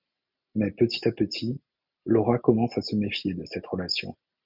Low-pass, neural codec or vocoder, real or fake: 5.4 kHz; none; real